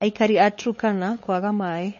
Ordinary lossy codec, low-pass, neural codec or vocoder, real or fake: MP3, 32 kbps; 7.2 kHz; codec, 16 kHz, 8 kbps, FunCodec, trained on LibriTTS, 25 frames a second; fake